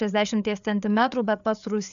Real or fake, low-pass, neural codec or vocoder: fake; 7.2 kHz; codec, 16 kHz, 4 kbps, FreqCodec, larger model